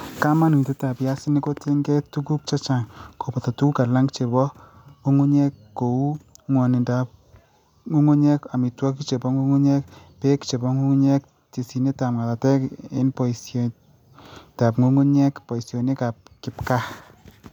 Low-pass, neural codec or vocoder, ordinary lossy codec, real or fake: 19.8 kHz; none; none; real